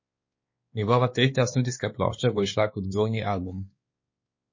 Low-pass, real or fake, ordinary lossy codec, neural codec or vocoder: 7.2 kHz; fake; MP3, 32 kbps; codec, 16 kHz, 2 kbps, X-Codec, WavLM features, trained on Multilingual LibriSpeech